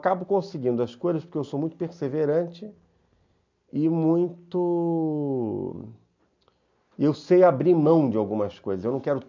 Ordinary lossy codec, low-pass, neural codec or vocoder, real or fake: MP3, 64 kbps; 7.2 kHz; none; real